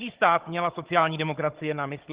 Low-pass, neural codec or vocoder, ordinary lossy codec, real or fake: 3.6 kHz; codec, 16 kHz, 16 kbps, FunCodec, trained on Chinese and English, 50 frames a second; Opus, 16 kbps; fake